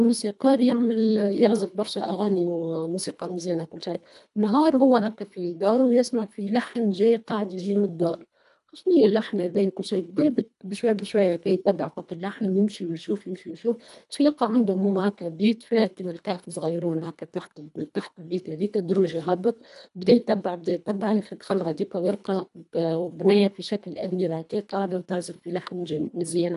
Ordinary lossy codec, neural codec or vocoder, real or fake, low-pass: none; codec, 24 kHz, 1.5 kbps, HILCodec; fake; 10.8 kHz